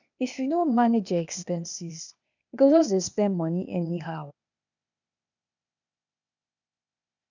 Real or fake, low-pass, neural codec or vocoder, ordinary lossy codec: fake; 7.2 kHz; codec, 16 kHz, 0.8 kbps, ZipCodec; none